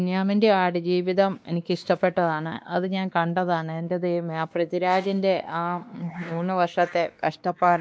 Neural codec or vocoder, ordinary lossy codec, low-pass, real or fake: codec, 16 kHz, 2 kbps, X-Codec, WavLM features, trained on Multilingual LibriSpeech; none; none; fake